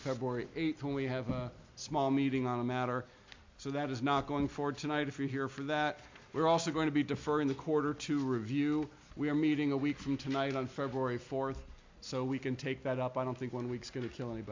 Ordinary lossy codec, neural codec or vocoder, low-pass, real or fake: MP3, 48 kbps; none; 7.2 kHz; real